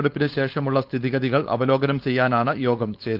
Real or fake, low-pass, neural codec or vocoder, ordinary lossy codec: fake; 5.4 kHz; codec, 16 kHz, 4.8 kbps, FACodec; Opus, 24 kbps